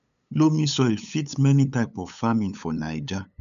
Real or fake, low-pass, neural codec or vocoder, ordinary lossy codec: fake; 7.2 kHz; codec, 16 kHz, 8 kbps, FunCodec, trained on LibriTTS, 25 frames a second; none